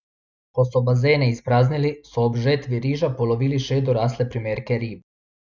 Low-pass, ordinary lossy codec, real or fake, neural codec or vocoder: 7.2 kHz; none; real; none